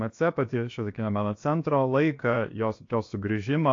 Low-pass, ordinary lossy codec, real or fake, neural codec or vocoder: 7.2 kHz; AAC, 48 kbps; fake; codec, 16 kHz, 0.7 kbps, FocalCodec